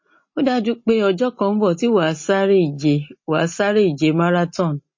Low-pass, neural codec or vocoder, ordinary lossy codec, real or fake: 7.2 kHz; none; MP3, 32 kbps; real